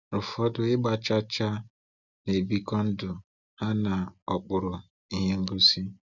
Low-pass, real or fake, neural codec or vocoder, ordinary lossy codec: 7.2 kHz; real; none; none